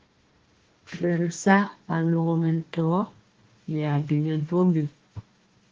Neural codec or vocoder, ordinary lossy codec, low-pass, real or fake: codec, 16 kHz, 1 kbps, FunCodec, trained on Chinese and English, 50 frames a second; Opus, 16 kbps; 7.2 kHz; fake